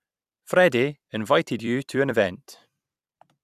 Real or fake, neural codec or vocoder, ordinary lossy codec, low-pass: fake; vocoder, 44.1 kHz, 128 mel bands every 256 samples, BigVGAN v2; none; 14.4 kHz